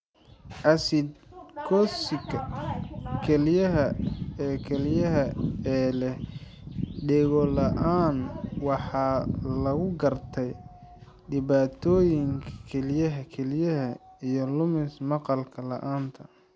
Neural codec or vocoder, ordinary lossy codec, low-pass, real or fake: none; none; none; real